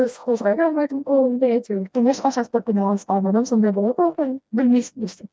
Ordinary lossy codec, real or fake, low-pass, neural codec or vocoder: none; fake; none; codec, 16 kHz, 1 kbps, FreqCodec, smaller model